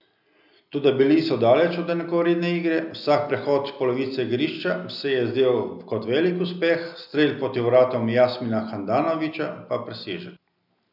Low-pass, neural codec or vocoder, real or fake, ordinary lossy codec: 5.4 kHz; none; real; none